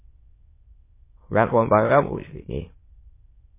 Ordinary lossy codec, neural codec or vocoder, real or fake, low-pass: MP3, 16 kbps; autoencoder, 22.05 kHz, a latent of 192 numbers a frame, VITS, trained on many speakers; fake; 3.6 kHz